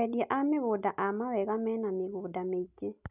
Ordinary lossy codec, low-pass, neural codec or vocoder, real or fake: none; 3.6 kHz; none; real